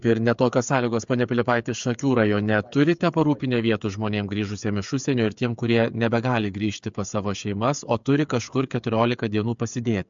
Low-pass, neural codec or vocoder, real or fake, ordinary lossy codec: 7.2 kHz; codec, 16 kHz, 8 kbps, FreqCodec, smaller model; fake; MP3, 64 kbps